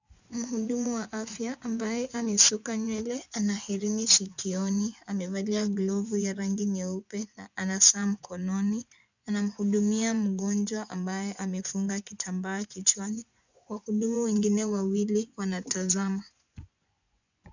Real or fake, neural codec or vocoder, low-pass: fake; vocoder, 24 kHz, 100 mel bands, Vocos; 7.2 kHz